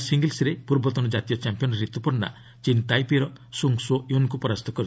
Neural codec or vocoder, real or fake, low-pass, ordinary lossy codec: none; real; none; none